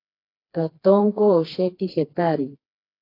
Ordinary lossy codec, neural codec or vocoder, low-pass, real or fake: AAC, 32 kbps; codec, 16 kHz, 2 kbps, FreqCodec, smaller model; 5.4 kHz; fake